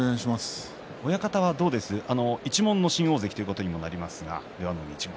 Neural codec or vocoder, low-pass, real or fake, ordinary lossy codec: none; none; real; none